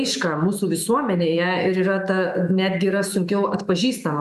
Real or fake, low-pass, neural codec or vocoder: fake; 14.4 kHz; autoencoder, 48 kHz, 128 numbers a frame, DAC-VAE, trained on Japanese speech